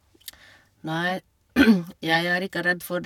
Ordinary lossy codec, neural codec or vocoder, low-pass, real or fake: none; codec, 44.1 kHz, 7.8 kbps, Pupu-Codec; 19.8 kHz; fake